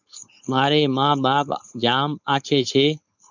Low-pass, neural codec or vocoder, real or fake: 7.2 kHz; codec, 16 kHz, 4.8 kbps, FACodec; fake